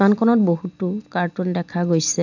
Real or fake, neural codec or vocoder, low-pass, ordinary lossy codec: real; none; 7.2 kHz; none